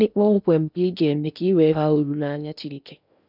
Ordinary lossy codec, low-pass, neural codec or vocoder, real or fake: none; 5.4 kHz; codec, 16 kHz in and 24 kHz out, 0.8 kbps, FocalCodec, streaming, 65536 codes; fake